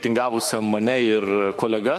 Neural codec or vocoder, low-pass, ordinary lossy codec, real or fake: autoencoder, 48 kHz, 32 numbers a frame, DAC-VAE, trained on Japanese speech; 14.4 kHz; MP3, 64 kbps; fake